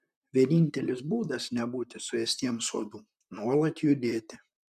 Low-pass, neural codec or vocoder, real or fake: 14.4 kHz; vocoder, 44.1 kHz, 128 mel bands, Pupu-Vocoder; fake